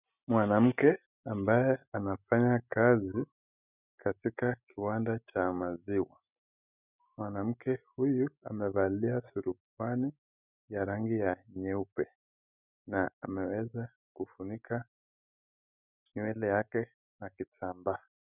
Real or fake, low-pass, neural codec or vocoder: real; 3.6 kHz; none